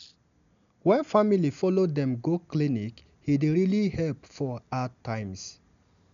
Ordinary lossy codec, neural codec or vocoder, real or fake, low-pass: none; none; real; 7.2 kHz